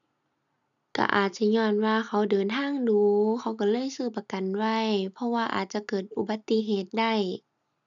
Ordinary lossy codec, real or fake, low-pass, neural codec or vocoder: none; real; 7.2 kHz; none